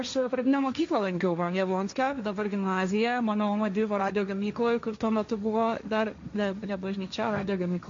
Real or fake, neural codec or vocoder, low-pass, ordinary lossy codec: fake; codec, 16 kHz, 1.1 kbps, Voila-Tokenizer; 7.2 kHz; MP3, 48 kbps